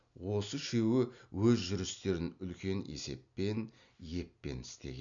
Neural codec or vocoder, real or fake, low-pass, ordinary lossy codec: none; real; 7.2 kHz; none